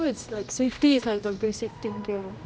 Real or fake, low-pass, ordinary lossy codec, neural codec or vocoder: fake; none; none; codec, 16 kHz, 1 kbps, X-Codec, HuBERT features, trained on general audio